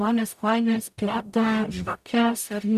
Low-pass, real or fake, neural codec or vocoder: 14.4 kHz; fake; codec, 44.1 kHz, 0.9 kbps, DAC